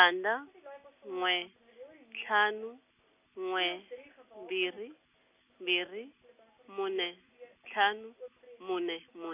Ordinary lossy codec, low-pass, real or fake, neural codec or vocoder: none; 3.6 kHz; real; none